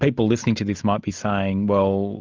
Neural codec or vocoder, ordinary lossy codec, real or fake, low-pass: none; Opus, 24 kbps; real; 7.2 kHz